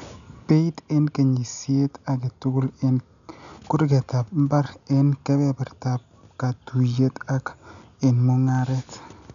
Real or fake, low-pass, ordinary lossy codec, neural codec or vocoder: real; 7.2 kHz; none; none